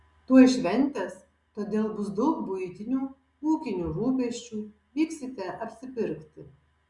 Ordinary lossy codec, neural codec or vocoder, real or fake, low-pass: Opus, 64 kbps; none; real; 10.8 kHz